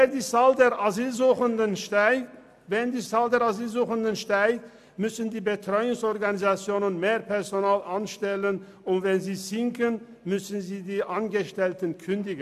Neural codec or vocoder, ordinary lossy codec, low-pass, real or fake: none; AAC, 96 kbps; 14.4 kHz; real